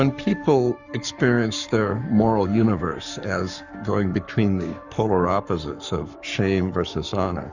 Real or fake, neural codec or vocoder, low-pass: fake; codec, 44.1 kHz, 7.8 kbps, Pupu-Codec; 7.2 kHz